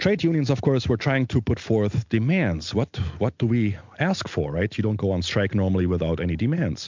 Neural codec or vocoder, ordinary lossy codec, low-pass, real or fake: none; MP3, 64 kbps; 7.2 kHz; real